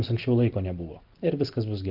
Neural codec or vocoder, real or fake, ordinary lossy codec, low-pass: none; real; Opus, 32 kbps; 5.4 kHz